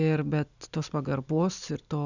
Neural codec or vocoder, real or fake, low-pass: none; real; 7.2 kHz